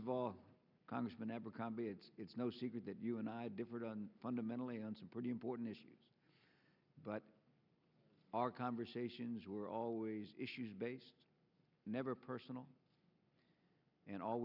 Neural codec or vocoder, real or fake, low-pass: none; real; 5.4 kHz